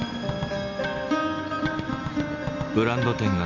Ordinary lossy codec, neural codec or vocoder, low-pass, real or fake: none; none; 7.2 kHz; real